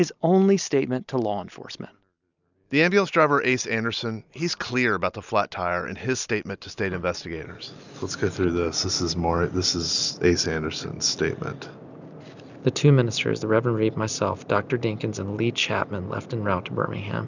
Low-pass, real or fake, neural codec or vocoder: 7.2 kHz; real; none